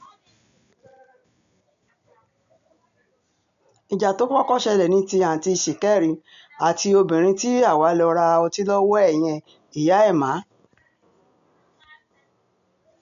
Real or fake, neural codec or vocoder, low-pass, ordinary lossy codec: real; none; 7.2 kHz; none